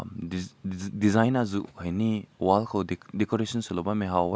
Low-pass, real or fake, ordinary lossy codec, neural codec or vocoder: none; real; none; none